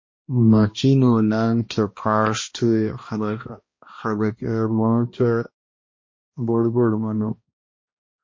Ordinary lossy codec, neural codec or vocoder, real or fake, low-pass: MP3, 32 kbps; codec, 16 kHz, 1 kbps, X-Codec, HuBERT features, trained on balanced general audio; fake; 7.2 kHz